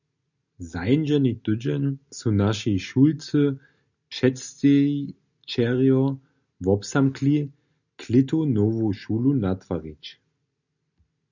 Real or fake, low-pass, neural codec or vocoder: real; 7.2 kHz; none